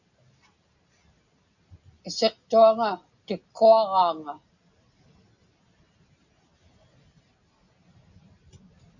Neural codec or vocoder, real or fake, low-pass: none; real; 7.2 kHz